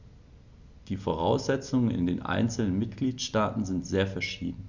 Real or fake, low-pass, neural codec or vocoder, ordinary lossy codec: real; 7.2 kHz; none; Opus, 64 kbps